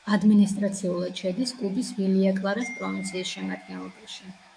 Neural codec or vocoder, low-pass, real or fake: autoencoder, 48 kHz, 128 numbers a frame, DAC-VAE, trained on Japanese speech; 9.9 kHz; fake